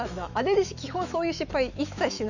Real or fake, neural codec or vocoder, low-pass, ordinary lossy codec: fake; autoencoder, 48 kHz, 128 numbers a frame, DAC-VAE, trained on Japanese speech; 7.2 kHz; none